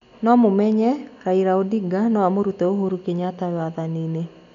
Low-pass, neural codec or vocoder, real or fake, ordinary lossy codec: 7.2 kHz; none; real; none